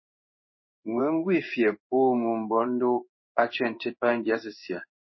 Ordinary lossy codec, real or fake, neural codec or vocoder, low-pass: MP3, 24 kbps; fake; codec, 16 kHz in and 24 kHz out, 1 kbps, XY-Tokenizer; 7.2 kHz